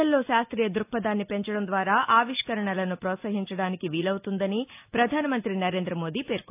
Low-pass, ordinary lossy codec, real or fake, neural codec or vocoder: 3.6 kHz; AAC, 32 kbps; real; none